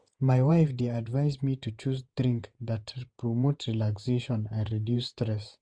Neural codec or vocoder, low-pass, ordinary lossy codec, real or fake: vocoder, 48 kHz, 128 mel bands, Vocos; 9.9 kHz; none; fake